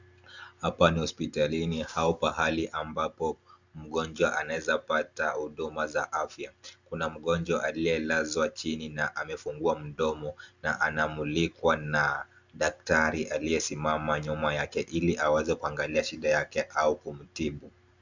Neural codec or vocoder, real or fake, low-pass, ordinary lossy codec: none; real; 7.2 kHz; Opus, 64 kbps